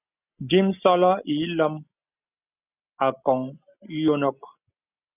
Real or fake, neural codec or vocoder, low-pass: real; none; 3.6 kHz